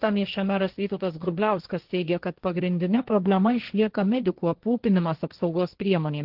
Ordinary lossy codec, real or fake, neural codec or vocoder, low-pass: Opus, 16 kbps; fake; codec, 16 kHz, 1.1 kbps, Voila-Tokenizer; 5.4 kHz